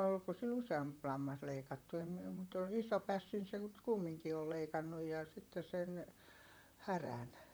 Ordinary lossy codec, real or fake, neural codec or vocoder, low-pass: none; fake; vocoder, 44.1 kHz, 128 mel bands, Pupu-Vocoder; none